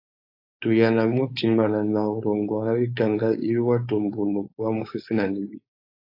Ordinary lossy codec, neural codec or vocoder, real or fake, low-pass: AAC, 32 kbps; codec, 16 kHz, 4.8 kbps, FACodec; fake; 5.4 kHz